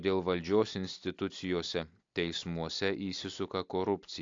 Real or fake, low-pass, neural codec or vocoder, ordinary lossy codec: real; 7.2 kHz; none; AAC, 48 kbps